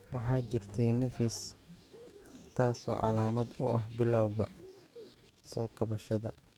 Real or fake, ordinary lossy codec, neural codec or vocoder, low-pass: fake; none; codec, 44.1 kHz, 2.6 kbps, DAC; 19.8 kHz